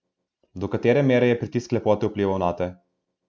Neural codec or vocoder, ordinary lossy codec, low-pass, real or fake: none; none; none; real